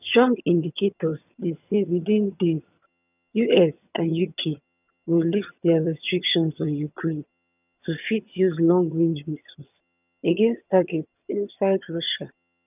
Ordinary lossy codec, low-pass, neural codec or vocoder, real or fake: none; 3.6 kHz; vocoder, 22.05 kHz, 80 mel bands, HiFi-GAN; fake